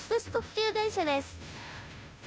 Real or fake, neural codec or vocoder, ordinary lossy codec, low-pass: fake; codec, 16 kHz, 0.5 kbps, FunCodec, trained on Chinese and English, 25 frames a second; none; none